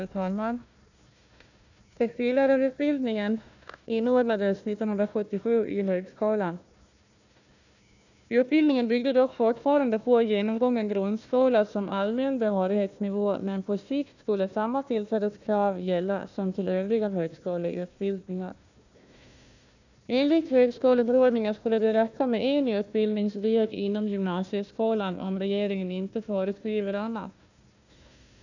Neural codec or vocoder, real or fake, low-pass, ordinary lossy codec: codec, 16 kHz, 1 kbps, FunCodec, trained on Chinese and English, 50 frames a second; fake; 7.2 kHz; none